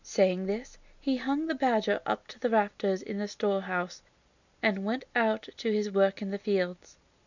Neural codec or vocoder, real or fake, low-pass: none; real; 7.2 kHz